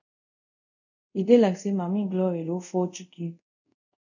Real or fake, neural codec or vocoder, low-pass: fake; codec, 24 kHz, 0.5 kbps, DualCodec; 7.2 kHz